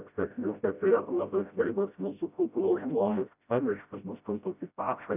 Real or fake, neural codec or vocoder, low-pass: fake; codec, 16 kHz, 0.5 kbps, FreqCodec, smaller model; 3.6 kHz